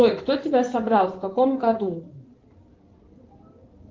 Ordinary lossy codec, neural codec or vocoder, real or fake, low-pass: Opus, 32 kbps; codec, 16 kHz in and 24 kHz out, 2.2 kbps, FireRedTTS-2 codec; fake; 7.2 kHz